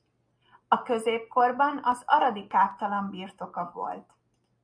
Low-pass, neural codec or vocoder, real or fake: 9.9 kHz; none; real